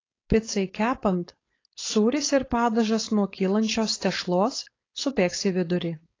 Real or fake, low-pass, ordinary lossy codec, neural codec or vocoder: fake; 7.2 kHz; AAC, 32 kbps; codec, 16 kHz, 4.8 kbps, FACodec